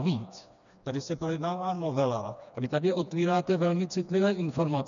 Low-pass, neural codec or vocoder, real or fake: 7.2 kHz; codec, 16 kHz, 2 kbps, FreqCodec, smaller model; fake